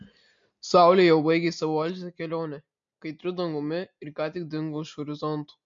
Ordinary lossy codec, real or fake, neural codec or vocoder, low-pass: MP3, 48 kbps; real; none; 7.2 kHz